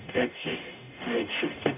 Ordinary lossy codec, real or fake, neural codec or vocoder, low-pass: none; fake; codec, 44.1 kHz, 0.9 kbps, DAC; 3.6 kHz